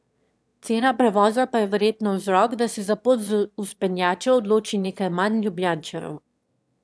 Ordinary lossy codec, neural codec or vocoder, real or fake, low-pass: none; autoencoder, 22.05 kHz, a latent of 192 numbers a frame, VITS, trained on one speaker; fake; none